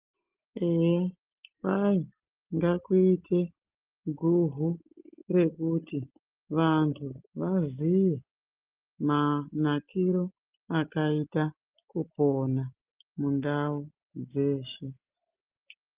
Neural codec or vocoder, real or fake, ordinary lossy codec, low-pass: none; real; Opus, 32 kbps; 3.6 kHz